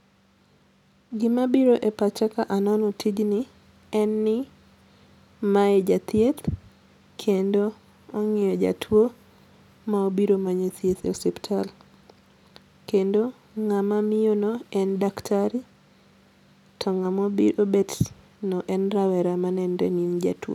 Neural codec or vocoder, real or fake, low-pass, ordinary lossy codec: none; real; 19.8 kHz; none